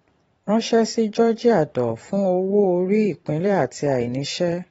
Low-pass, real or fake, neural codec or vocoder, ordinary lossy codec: 19.8 kHz; fake; vocoder, 44.1 kHz, 128 mel bands every 512 samples, BigVGAN v2; AAC, 24 kbps